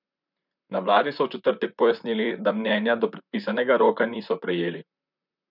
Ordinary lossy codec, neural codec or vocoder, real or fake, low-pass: none; vocoder, 44.1 kHz, 128 mel bands, Pupu-Vocoder; fake; 5.4 kHz